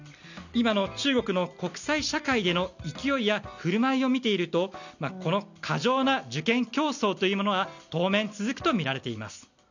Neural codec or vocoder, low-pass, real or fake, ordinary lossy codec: none; 7.2 kHz; real; none